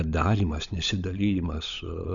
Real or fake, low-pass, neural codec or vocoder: fake; 7.2 kHz; codec, 16 kHz, 16 kbps, FunCodec, trained on LibriTTS, 50 frames a second